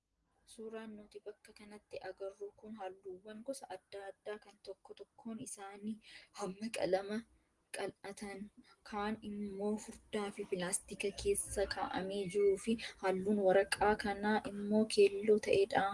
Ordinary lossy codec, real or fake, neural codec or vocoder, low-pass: Opus, 24 kbps; real; none; 10.8 kHz